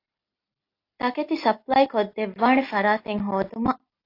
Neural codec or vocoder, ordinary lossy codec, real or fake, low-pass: none; AAC, 32 kbps; real; 5.4 kHz